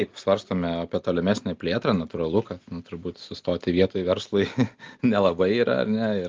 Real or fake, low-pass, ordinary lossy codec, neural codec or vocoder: real; 7.2 kHz; Opus, 24 kbps; none